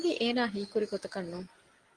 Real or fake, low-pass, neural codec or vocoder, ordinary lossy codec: real; 9.9 kHz; none; Opus, 24 kbps